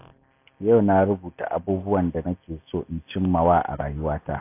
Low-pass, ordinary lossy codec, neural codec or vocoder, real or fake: 3.6 kHz; AAC, 24 kbps; none; real